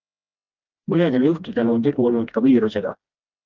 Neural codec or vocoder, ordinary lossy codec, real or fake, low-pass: codec, 16 kHz, 1 kbps, FreqCodec, smaller model; Opus, 24 kbps; fake; 7.2 kHz